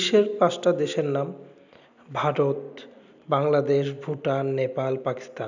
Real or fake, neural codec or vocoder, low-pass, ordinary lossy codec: real; none; 7.2 kHz; none